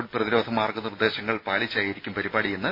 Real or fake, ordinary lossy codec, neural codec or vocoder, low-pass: real; MP3, 24 kbps; none; 5.4 kHz